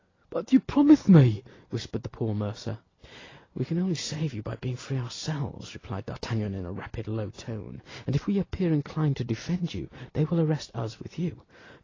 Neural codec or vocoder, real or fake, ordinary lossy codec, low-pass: none; real; AAC, 32 kbps; 7.2 kHz